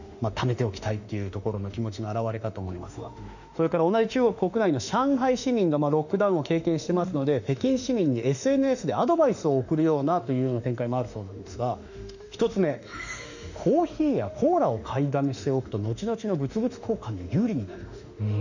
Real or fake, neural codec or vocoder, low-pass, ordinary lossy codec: fake; autoencoder, 48 kHz, 32 numbers a frame, DAC-VAE, trained on Japanese speech; 7.2 kHz; none